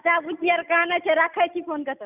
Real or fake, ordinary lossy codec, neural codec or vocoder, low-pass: real; none; none; 3.6 kHz